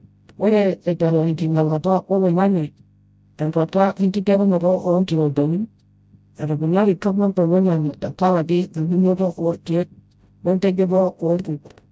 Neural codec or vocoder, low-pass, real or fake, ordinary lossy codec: codec, 16 kHz, 0.5 kbps, FreqCodec, smaller model; none; fake; none